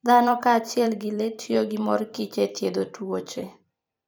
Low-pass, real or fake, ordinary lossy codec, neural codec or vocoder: none; fake; none; vocoder, 44.1 kHz, 128 mel bands every 512 samples, BigVGAN v2